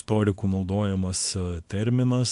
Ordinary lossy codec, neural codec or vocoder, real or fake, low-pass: AAC, 64 kbps; codec, 24 kHz, 0.9 kbps, WavTokenizer, small release; fake; 10.8 kHz